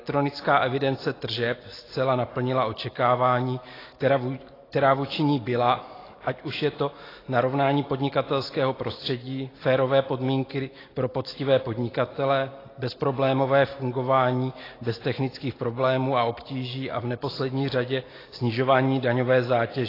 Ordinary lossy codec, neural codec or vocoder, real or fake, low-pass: AAC, 24 kbps; none; real; 5.4 kHz